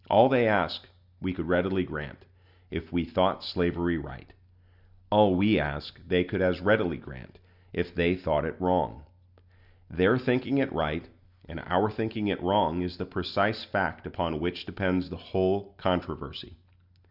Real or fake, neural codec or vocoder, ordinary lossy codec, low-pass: real; none; Opus, 64 kbps; 5.4 kHz